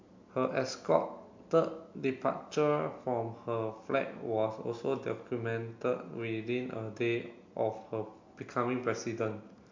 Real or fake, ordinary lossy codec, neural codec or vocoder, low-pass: real; MP3, 48 kbps; none; 7.2 kHz